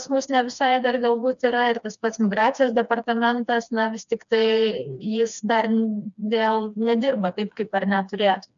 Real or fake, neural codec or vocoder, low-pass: fake; codec, 16 kHz, 2 kbps, FreqCodec, smaller model; 7.2 kHz